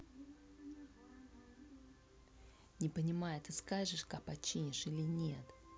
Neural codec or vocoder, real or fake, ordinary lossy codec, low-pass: none; real; none; none